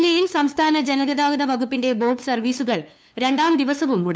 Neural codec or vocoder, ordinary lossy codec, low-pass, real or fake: codec, 16 kHz, 2 kbps, FunCodec, trained on LibriTTS, 25 frames a second; none; none; fake